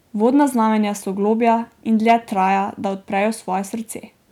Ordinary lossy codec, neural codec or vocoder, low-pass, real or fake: none; none; 19.8 kHz; real